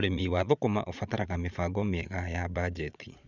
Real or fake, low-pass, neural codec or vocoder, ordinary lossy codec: fake; 7.2 kHz; vocoder, 22.05 kHz, 80 mel bands, Vocos; none